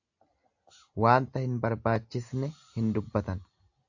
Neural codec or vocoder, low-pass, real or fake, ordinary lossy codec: none; 7.2 kHz; real; AAC, 48 kbps